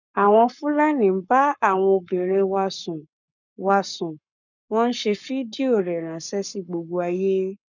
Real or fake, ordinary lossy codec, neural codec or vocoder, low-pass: fake; none; codec, 44.1 kHz, 7.8 kbps, Pupu-Codec; 7.2 kHz